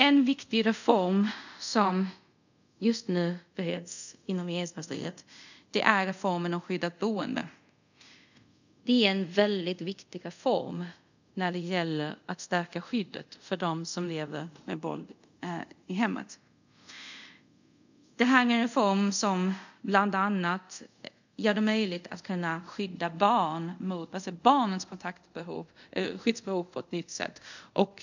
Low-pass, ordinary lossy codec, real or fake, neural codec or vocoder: 7.2 kHz; none; fake; codec, 24 kHz, 0.5 kbps, DualCodec